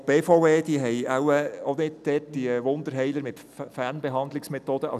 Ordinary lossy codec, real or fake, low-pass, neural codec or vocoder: none; real; 14.4 kHz; none